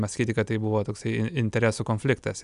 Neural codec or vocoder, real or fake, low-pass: none; real; 10.8 kHz